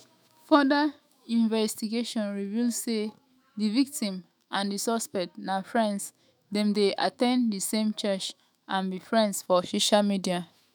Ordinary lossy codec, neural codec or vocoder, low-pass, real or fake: none; autoencoder, 48 kHz, 128 numbers a frame, DAC-VAE, trained on Japanese speech; none; fake